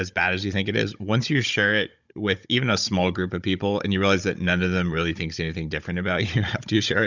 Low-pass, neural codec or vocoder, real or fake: 7.2 kHz; codec, 16 kHz, 16 kbps, FunCodec, trained on Chinese and English, 50 frames a second; fake